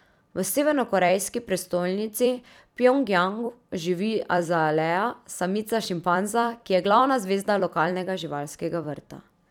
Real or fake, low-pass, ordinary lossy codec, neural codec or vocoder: fake; 19.8 kHz; none; vocoder, 44.1 kHz, 128 mel bands every 256 samples, BigVGAN v2